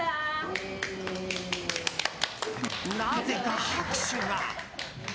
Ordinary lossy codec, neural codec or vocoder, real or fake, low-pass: none; none; real; none